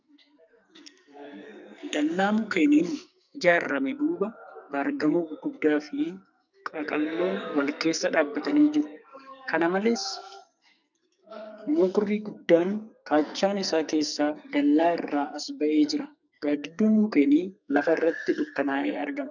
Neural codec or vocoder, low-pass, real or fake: codec, 44.1 kHz, 2.6 kbps, SNAC; 7.2 kHz; fake